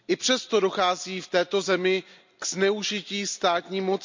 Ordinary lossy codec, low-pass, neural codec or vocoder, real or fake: MP3, 64 kbps; 7.2 kHz; none; real